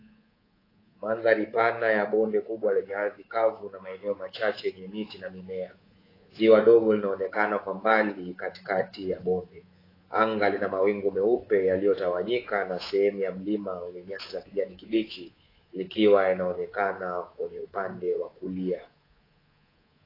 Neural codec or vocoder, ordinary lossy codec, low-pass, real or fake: codec, 24 kHz, 3.1 kbps, DualCodec; AAC, 24 kbps; 5.4 kHz; fake